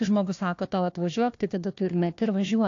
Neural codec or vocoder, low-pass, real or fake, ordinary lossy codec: codec, 16 kHz, 1 kbps, FunCodec, trained on Chinese and English, 50 frames a second; 7.2 kHz; fake; AAC, 48 kbps